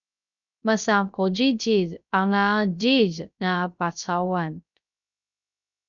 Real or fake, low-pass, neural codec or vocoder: fake; 7.2 kHz; codec, 16 kHz, 0.3 kbps, FocalCodec